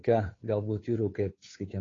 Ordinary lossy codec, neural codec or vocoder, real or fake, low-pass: AAC, 32 kbps; codec, 16 kHz, 2 kbps, FunCodec, trained on Chinese and English, 25 frames a second; fake; 7.2 kHz